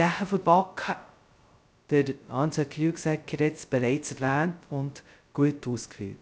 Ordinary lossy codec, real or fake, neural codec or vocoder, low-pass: none; fake; codec, 16 kHz, 0.2 kbps, FocalCodec; none